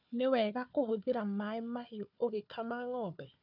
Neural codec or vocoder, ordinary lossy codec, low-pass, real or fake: codec, 16 kHz, 4 kbps, FunCodec, trained on Chinese and English, 50 frames a second; none; 5.4 kHz; fake